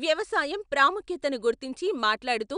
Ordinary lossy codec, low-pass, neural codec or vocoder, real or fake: none; 9.9 kHz; none; real